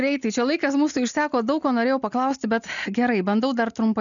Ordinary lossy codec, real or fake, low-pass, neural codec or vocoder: MP3, 96 kbps; real; 7.2 kHz; none